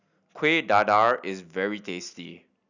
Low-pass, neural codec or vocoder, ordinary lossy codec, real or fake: 7.2 kHz; none; none; real